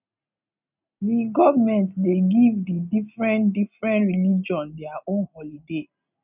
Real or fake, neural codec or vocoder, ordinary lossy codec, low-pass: real; none; none; 3.6 kHz